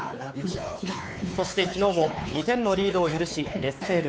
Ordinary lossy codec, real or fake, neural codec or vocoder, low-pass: none; fake; codec, 16 kHz, 4 kbps, X-Codec, WavLM features, trained on Multilingual LibriSpeech; none